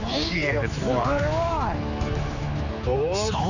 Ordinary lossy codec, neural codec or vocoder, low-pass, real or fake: none; codec, 16 kHz, 4 kbps, X-Codec, HuBERT features, trained on general audio; 7.2 kHz; fake